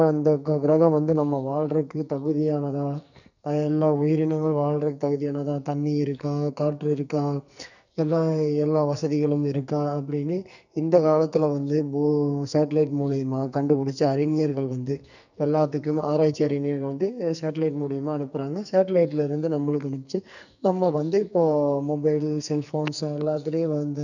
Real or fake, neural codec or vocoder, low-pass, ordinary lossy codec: fake; codec, 44.1 kHz, 2.6 kbps, SNAC; 7.2 kHz; none